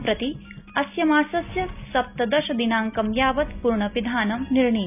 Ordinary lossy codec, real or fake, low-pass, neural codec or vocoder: none; real; 3.6 kHz; none